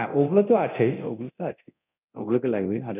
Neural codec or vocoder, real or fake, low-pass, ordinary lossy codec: codec, 24 kHz, 0.9 kbps, DualCodec; fake; 3.6 kHz; none